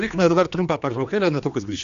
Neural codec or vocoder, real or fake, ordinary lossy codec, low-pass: codec, 16 kHz, 2 kbps, X-Codec, HuBERT features, trained on general audio; fake; MP3, 96 kbps; 7.2 kHz